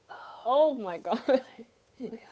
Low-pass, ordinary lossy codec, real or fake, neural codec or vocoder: none; none; fake; codec, 16 kHz, 8 kbps, FunCodec, trained on Chinese and English, 25 frames a second